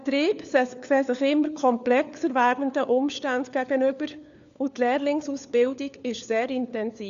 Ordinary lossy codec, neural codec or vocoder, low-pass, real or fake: none; codec, 16 kHz, 4 kbps, FunCodec, trained on LibriTTS, 50 frames a second; 7.2 kHz; fake